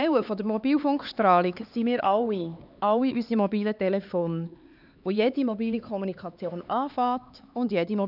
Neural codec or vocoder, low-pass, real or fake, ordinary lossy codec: codec, 16 kHz, 4 kbps, X-Codec, HuBERT features, trained on LibriSpeech; 5.4 kHz; fake; none